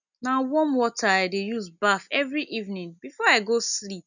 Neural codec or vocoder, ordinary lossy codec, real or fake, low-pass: none; none; real; 7.2 kHz